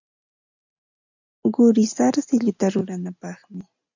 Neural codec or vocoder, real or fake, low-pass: none; real; 7.2 kHz